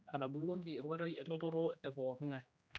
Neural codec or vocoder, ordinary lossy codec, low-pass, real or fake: codec, 16 kHz, 1 kbps, X-Codec, HuBERT features, trained on general audio; none; none; fake